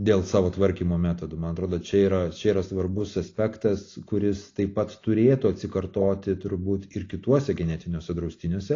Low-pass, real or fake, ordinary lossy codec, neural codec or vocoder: 7.2 kHz; real; AAC, 32 kbps; none